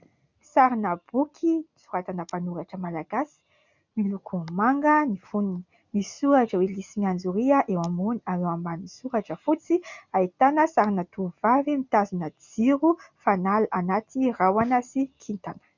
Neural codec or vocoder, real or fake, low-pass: none; real; 7.2 kHz